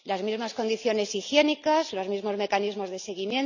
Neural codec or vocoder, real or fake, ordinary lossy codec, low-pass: none; real; none; 7.2 kHz